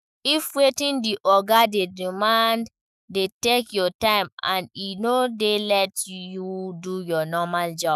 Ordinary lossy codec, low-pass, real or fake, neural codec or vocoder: none; 14.4 kHz; fake; autoencoder, 48 kHz, 128 numbers a frame, DAC-VAE, trained on Japanese speech